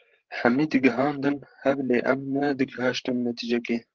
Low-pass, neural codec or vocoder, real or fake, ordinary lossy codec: 7.2 kHz; codec, 16 kHz, 16 kbps, FreqCodec, larger model; fake; Opus, 16 kbps